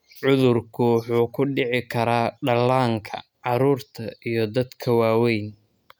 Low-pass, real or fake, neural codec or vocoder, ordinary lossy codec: none; real; none; none